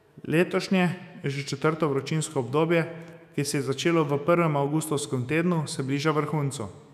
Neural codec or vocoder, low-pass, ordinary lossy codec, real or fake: autoencoder, 48 kHz, 128 numbers a frame, DAC-VAE, trained on Japanese speech; 14.4 kHz; none; fake